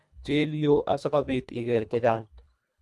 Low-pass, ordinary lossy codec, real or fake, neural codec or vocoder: none; none; fake; codec, 24 kHz, 1.5 kbps, HILCodec